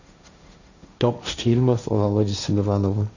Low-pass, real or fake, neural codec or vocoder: 7.2 kHz; fake; codec, 16 kHz, 1.1 kbps, Voila-Tokenizer